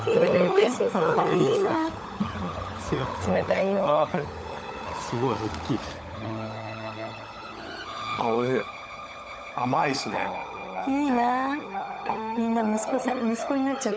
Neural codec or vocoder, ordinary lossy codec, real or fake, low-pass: codec, 16 kHz, 16 kbps, FunCodec, trained on LibriTTS, 50 frames a second; none; fake; none